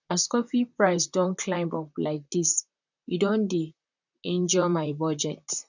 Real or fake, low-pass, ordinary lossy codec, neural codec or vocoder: fake; 7.2 kHz; none; vocoder, 44.1 kHz, 128 mel bands, Pupu-Vocoder